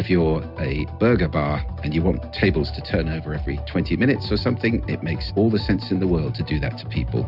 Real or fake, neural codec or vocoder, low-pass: real; none; 5.4 kHz